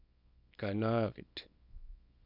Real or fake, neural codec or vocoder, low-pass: fake; codec, 24 kHz, 0.9 kbps, WavTokenizer, small release; 5.4 kHz